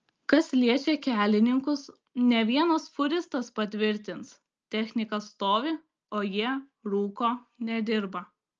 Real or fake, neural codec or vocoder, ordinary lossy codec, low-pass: real; none; Opus, 24 kbps; 7.2 kHz